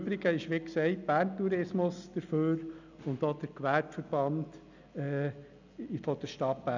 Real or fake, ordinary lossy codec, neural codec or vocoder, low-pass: real; none; none; 7.2 kHz